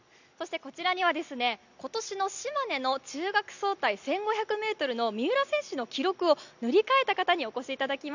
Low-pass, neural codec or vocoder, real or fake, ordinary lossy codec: 7.2 kHz; none; real; none